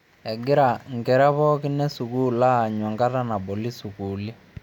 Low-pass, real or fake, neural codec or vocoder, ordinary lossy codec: 19.8 kHz; real; none; none